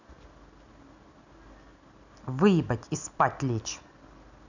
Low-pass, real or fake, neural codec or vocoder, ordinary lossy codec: 7.2 kHz; real; none; none